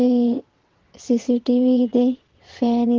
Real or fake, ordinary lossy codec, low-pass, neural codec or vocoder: fake; Opus, 16 kbps; 7.2 kHz; vocoder, 22.05 kHz, 80 mel bands, WaveNeXt